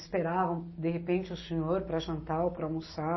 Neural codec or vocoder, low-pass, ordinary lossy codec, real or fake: none; 7.2 kHz; MP3, 24 kbps; real